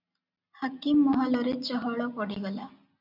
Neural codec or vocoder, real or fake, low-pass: none; real; 5.4 kHz